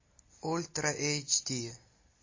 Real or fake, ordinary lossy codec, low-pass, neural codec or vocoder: real; MP3, 32 kbps; 7.2 kHz; none